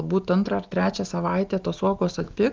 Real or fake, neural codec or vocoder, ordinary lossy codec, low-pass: real; none; Opus, 32 kbps; 7.2 kHz